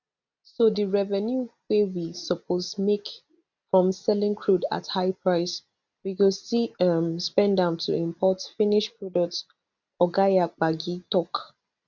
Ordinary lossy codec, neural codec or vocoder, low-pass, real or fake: none; none; 7.2 kHz; real